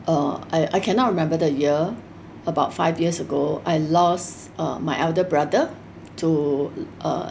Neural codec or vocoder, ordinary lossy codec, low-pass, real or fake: none; none; none; real